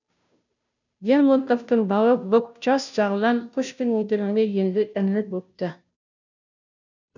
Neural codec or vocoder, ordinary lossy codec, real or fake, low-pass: codec, 16 kHz, 0.5 kbps, FunCodec, trained on Chinese and English, 25 frames a second; none; fake; 7.2 kHz